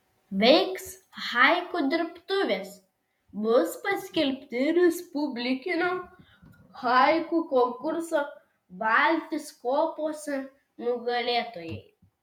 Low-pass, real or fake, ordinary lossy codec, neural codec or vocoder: 19.8 kHz; fake; MP3, 96 kbps; vocoder, 48 kHz, 128 mel bands, Vocos